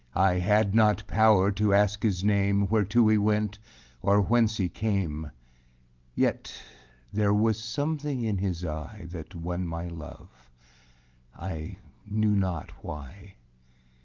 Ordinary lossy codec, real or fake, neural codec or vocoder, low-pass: Opus, 32 kbps; real; none; 7.2 kHz